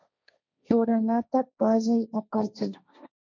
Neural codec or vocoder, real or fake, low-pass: codec, 16 kHz, 1.1 kbps, Voila-Tokenizer; fake; 7.2 kHz